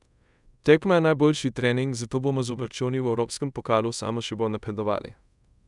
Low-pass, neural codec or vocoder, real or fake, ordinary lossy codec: 10.8 kHz; codec, 24 kHz, 0.5 kbps, DualCodec; fake; none